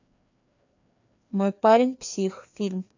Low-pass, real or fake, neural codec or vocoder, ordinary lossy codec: 7.2 kHz; fake; codec, 16 kHz, 2 kbps, FreqCodec, larger model; AAC, 48 kbps